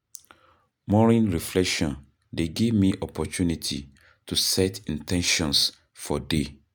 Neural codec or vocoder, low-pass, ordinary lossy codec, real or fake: none; none; none; real